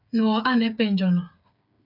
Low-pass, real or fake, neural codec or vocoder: 5.4 kHz; fake; codec, 16 kHz, 8 kbps, FreqCodec, smaller model